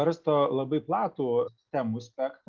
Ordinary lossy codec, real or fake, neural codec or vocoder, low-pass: Opus, 32 kbps; real; none; 7.2 kHz